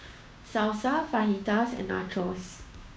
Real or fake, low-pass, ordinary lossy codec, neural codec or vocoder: fake; none; none; codec, 16 kHz, 6 kbps, DAC